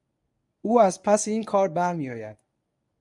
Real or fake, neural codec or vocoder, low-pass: fake; codec, 24 kHz, 0.9 kbps, WavTokenizer, medium speech release version 1; 10.8 kHz